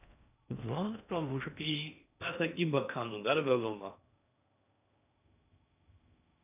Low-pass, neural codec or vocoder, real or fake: 3.6 kHz; codec, 16 kHz in and 24 kHz out, 0.6 kbps, FocalCodec, streaming, 4096 codes; fake